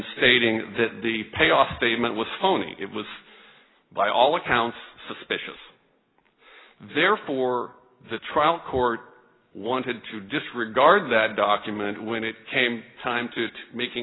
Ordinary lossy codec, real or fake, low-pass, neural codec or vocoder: AAC, 16 kbps; real; 7.2 kHz; none